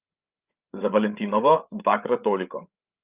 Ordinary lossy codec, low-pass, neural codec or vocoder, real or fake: Opus, 24 kbps; 3.6 kHz; codec, 16 kHz, 8 kbps, FreqCodec, larger model; fake